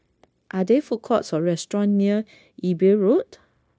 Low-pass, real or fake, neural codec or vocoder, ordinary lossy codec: none; fake; codec, 16 kHz, 0.9 kbps, LongCat-Audio-Codec; none